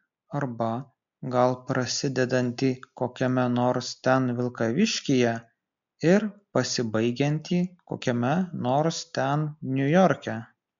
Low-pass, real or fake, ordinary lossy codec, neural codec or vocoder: 7.2 kHz; real; MP3, 64 kbps; none